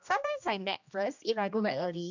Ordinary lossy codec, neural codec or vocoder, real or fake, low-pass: none; codec, 16 kHz, 1 kbps, X-Codec, HuBERT features, trained on general audio; fake; 7.2 kHz